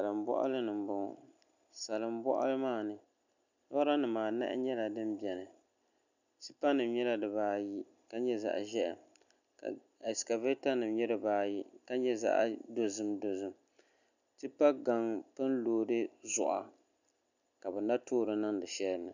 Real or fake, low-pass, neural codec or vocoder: real; 7.2 kHz; none